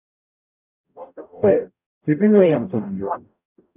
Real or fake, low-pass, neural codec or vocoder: fake; 3.6 kHz; codec, 44.1 kHz, 0.9 kbps, DAC